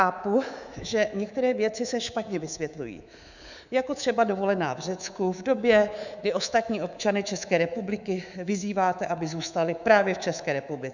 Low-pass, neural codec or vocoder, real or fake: 7.2 kHz; codec, 24 kHz, 3.1 kbps, DualCodec; fake